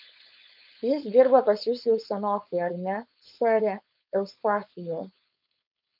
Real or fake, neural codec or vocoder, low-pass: fake; codec, 16 kHz, 4.8 kbps, FACodec; 5.4 kHz